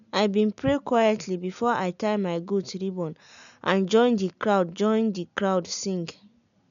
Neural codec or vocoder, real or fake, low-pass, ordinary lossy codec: none; real; 7.2 kHz; none